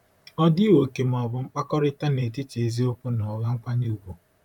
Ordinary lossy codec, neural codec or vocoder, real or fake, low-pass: none; vocoder, 44.1 kHz, 128 mel bands, Pupu-Vocoder; fake; 19.8 kHz